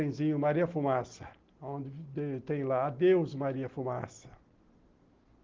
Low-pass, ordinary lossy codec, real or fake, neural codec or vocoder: 7.2 kHz; Opus, 16 kbps; real; none